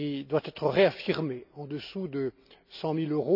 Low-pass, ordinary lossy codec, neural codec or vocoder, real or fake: 5.4 kHz; none; none; real